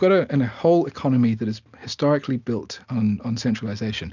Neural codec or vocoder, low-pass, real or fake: none; 7.2 kHz; real